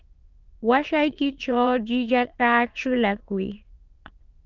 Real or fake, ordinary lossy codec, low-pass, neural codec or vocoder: fake; Opus, 24 kbps; 7.2 kHz; autoencoder, 22.05 kHz, a latent of 192 numbers a frame, VITS, trained on many speakers